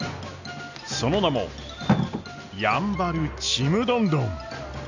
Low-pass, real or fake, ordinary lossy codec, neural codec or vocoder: 7.2 kHz; real; none; none